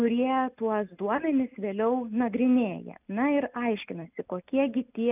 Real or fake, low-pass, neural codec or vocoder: real; 3.6 kHz; none